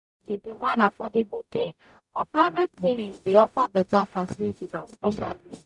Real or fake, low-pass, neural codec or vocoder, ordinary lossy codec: fake; 10.8 kHz; codec, 44.1 kHz, 0.9 kbps, DAC; none